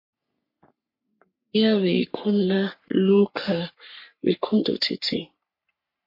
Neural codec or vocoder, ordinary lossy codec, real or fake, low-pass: codec, 44.1 kHz, 3.4 kbps, Pupu-Codec; MP3, 32 kbps; fake; 5.4 kHz